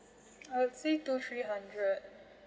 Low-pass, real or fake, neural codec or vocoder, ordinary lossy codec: none; real; none; none